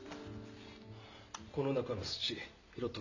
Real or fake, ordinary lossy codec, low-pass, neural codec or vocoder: real; none; 7.2 kHz; none